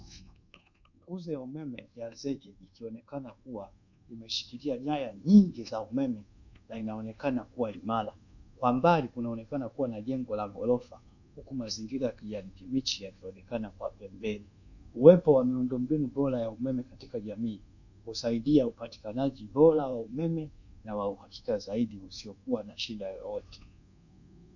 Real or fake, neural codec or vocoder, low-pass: fake; codec, 24 kHz, 1.2 kbps, DualCodec; 7.2 kHz